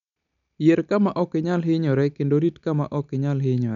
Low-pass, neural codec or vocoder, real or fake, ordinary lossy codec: 7.2 kHz; none; real; none